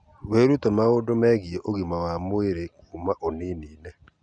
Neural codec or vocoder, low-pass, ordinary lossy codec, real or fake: none; 9.9 kHz; none; real